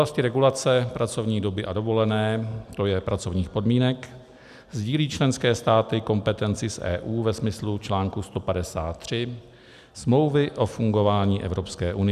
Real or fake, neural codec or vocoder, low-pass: real; none; 14.4 kHz